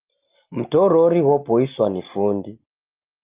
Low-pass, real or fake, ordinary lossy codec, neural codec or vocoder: 3.6 kHz; real; Opus, 32 kbps; none